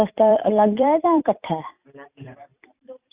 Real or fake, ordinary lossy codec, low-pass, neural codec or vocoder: fake; Opus, 64 kbps; 3.6 kHz; vocoder, 44.1 kHz, 128 mel bands every 256 samples, BigVGAN v2